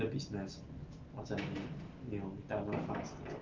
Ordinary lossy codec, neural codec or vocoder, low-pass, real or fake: Opus, 32 kbps; none; 7.2 kHz; real